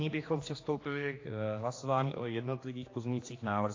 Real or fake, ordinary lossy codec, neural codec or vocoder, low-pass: fake; AAC, 32 kbps; codec, 16 kHz, 2 kbps, X-Codec, HuBERT features, trained on general audio; 7.2 kHz